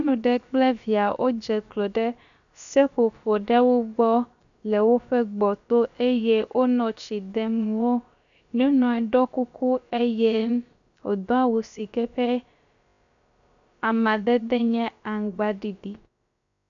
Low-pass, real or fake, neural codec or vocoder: 7.2 kHz; fake; codec, 16 kHz, about 1 kbps, DyCAST, with the encoder's durations